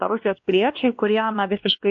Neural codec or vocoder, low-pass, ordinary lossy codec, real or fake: codec, 16 kHz, 1 kbps, X-Codec, WavLM features, trained on Multilingual LibriSpeech; 7.2 kHz; AAC, 64 kbps; fake